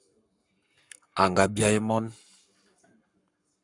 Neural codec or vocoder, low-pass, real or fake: codec, 44.1 kHz, 7.8 kbps, Pupu-Codec; 10.8 kHz; fake